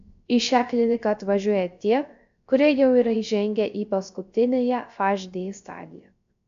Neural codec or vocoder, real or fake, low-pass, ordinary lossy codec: codec, 16 kHz, 0.3 kbps, FocalCodec; fake; 7.2 kHz; AAC, 64 kbps